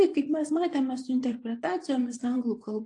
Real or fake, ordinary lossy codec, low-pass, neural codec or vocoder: fake; AAC, 48 kbps; 10.8 kHz; vocoder, 24 kHz, 100 mel bands, Vocos